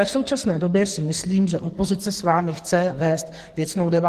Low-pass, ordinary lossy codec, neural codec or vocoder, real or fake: 14.4 kHz; Opus, 16 kbps; codec, 44.1 kHz, 2.6 kbps, SNAC; fake